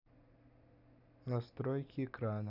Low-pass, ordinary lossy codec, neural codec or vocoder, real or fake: 5.4 kHz; none; none; real